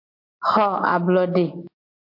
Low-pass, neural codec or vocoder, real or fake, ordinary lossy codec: 5.4 kHz; none; real; MP3, 32 kbps